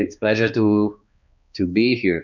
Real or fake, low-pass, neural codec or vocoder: fake; 7.2 kHz; codec, 16 kHz, 2 kbps, X-Codec, HuBERT features, trained on balanced general audio